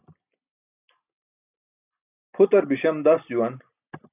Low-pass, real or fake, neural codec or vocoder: 3.6 kHz; real; none